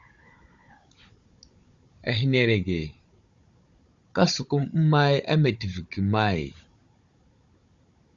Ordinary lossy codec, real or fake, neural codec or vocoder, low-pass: Opus, 64 kbps; fake; codec, 16 kHz, 16 kbps, FunCodec, trained on Chinese and English, 50 frames a second; 7.2 kHz